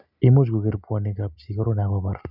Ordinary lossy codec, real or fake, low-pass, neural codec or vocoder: none; real; 5.4 kHz; none